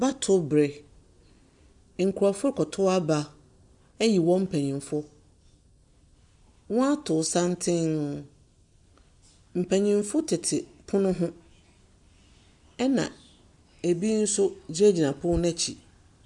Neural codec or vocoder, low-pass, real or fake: none; 10.8 kHz; real